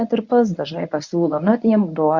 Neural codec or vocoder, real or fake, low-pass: codec, 24 kHz, 0.9 kbps, WavTokenizer, medium speech release version 1; fake; 7.2 kHz